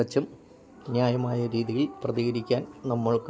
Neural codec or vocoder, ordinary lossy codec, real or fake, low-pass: none; none; real; none